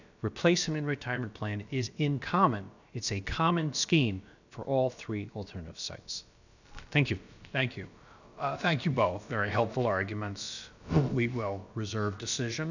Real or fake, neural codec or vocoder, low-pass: fake; codec, 16 kHz, about 1 kbps, DyCAST, with the encoder's durations; 7.2 kHz